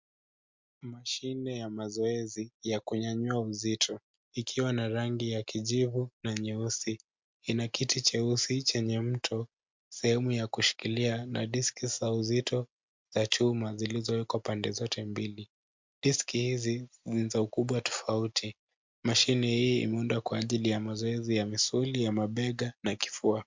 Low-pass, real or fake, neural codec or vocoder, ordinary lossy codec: 7.2 kHz; real; none; MP3, 64 kbps